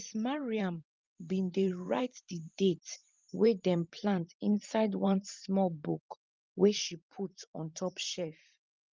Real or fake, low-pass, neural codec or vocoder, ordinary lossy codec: fake; 7.2 kHz; vocoder, 44.1 kHz, 128 mel bands every 512 samples, BigVGAN v2; Opus, 32 kbps